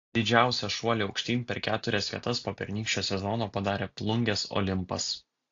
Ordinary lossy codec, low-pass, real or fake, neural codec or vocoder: AAC, 48 kbps; 7.2 kHz; real; none